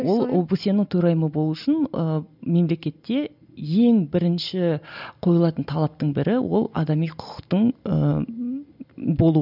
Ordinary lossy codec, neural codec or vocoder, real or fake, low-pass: none; none; real; 5.4 kHz